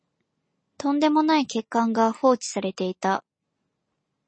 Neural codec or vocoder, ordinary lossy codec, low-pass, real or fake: none; MP3, 32 kbps; 9.9 kHz; real